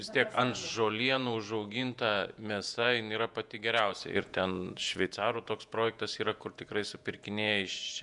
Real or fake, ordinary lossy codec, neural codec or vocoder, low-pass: fake; MP3, 96 kbps; vocoder, 48 kHz, 128 mel bands, Vocos; 10.8 kHz